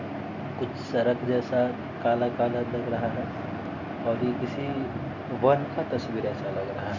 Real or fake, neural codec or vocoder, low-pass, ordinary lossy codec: real; none; 7.2 kHz; Opus, 64 kbps